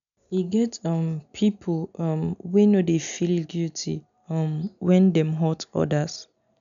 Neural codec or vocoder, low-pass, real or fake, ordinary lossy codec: none; 7.2 kHz; real; none